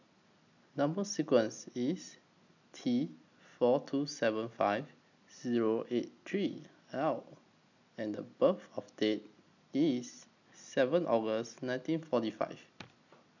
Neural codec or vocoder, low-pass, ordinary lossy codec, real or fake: none; 7.2 kHz; none; real